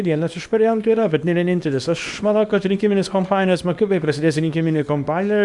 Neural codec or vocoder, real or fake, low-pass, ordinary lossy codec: codec, 24 kHz, 0.9 kbps, WavTokenizer, small release; fake; 10.8 kHz; Opus, 64 kbps